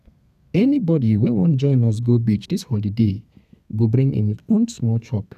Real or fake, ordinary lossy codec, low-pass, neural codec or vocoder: fake; none; 14.4 kHz; codec, 32 kHz, 1.9 kbps, SNAC